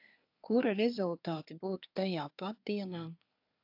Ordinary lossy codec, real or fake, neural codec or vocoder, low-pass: AAC, 48 kbps; fake; codec, 24 kHz, 1 kbps, SNAC; 5.4 kHz